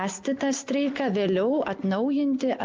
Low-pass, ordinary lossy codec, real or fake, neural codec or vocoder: 7.2 kHz; Opus, 32 kbps; real; none